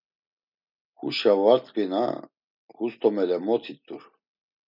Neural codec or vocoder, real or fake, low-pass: none; real; 5.4 kHz